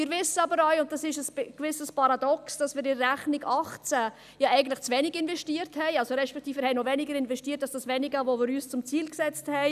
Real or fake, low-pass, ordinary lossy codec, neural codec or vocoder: real; 14.4 kHz; none; none